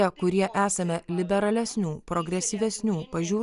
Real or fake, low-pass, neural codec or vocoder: real; 10.8 kHz; none